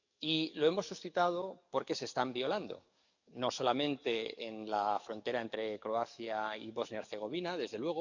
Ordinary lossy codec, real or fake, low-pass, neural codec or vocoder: none; fake; 7.2 kHz; codec, 44.1 kHz, 7.8 kbps, DAC